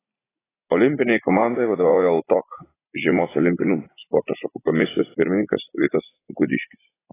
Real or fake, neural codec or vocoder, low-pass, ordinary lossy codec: fake; vocoder, 44.1 kHz, 80 mel bands, Vocos; 3.6 kHz; AAC, 16 kbps